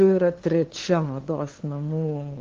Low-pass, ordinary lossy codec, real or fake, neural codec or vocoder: 7.2 kHz; Opus, 16 kbps; fake; codec, 16 kHz, 2 kbps, FunCodec, trained on LibriTTS, 25 frames a second